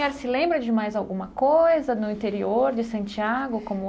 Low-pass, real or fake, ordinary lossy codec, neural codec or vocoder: none; real; none; none